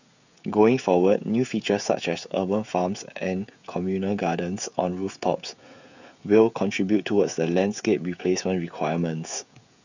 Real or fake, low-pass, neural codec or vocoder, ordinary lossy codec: fake; 7.2 kHz; codec, 16 kHz, 16 kbps, FreqCodec, smaller model; none